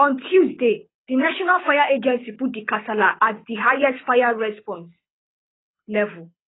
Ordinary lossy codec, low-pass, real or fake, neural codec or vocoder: AAC, 16 kbps; 7.2 kHz; fake; codec, 24 kHz, 6 kbps, HILCodec